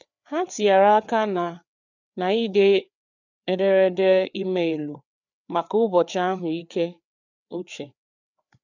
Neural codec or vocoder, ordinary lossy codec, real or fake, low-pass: codec, 16 kHz, 4 kbps, FreqCodec, larger model; none; fake; 7.2 kHz